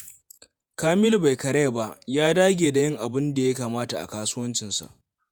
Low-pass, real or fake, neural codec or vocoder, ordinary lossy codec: none; fake; vocoder, 48 kHz, 128 mel bands, Vocos; none